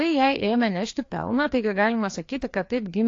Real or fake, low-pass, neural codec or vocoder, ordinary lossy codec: fake; 7.2 kHz; codec, 16 kHz, 2 kbps, FreqCodec, larger model; AAC, 48 kbps